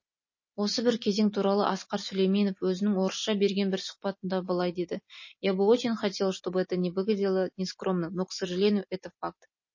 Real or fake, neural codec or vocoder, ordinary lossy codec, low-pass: real; none; MP3, 32 kbps; 7.2 kHz